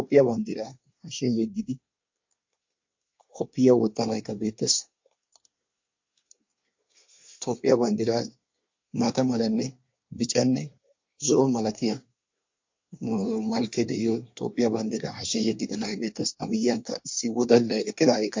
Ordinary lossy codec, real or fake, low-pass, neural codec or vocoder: MP3, 48 kbps; fake; 7.2 kHz; codec, 24 kHz, 1 kbps, SNAC